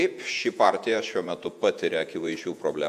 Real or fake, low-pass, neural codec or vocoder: fake; 14.4 kHz; vocoder, 48 kHz, 128 mel bands, Vocos